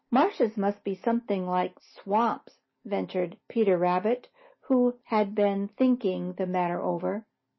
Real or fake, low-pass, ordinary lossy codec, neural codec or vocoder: real; 7.2 kHz; MP3, 24 kbps; none